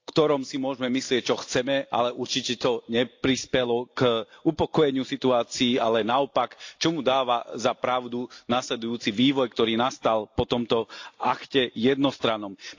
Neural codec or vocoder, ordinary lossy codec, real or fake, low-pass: none; AAC, 48 kbps; real; 7.2 kHz